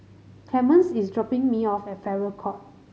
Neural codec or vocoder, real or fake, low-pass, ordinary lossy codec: none; real; none; none